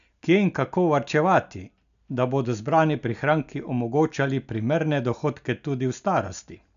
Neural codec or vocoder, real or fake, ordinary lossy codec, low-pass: none; real; none; 7.2 kHz